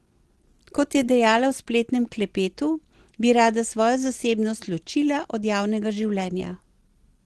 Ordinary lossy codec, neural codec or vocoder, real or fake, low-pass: Opus, 16 kbps; none; real; 10.8 kHz